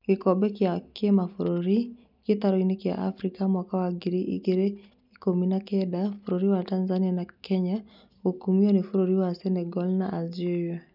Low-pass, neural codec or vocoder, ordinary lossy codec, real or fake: 5.4 kHz; none; none; real